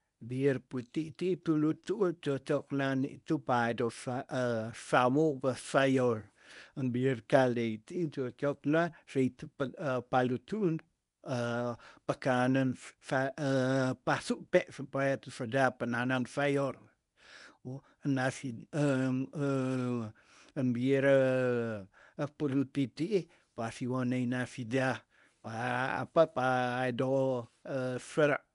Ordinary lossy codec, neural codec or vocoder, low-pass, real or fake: none; codec, 24 kHz, 0.9 kbps, WavTokenizer, medium speech release version 1; 10.8 kHz; fake